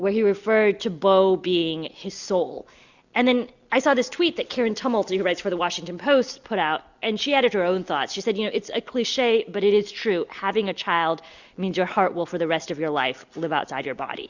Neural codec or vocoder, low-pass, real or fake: none; 7.2 kHz; real